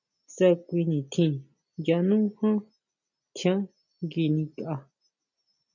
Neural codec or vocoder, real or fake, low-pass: none; real; 7.2 kHz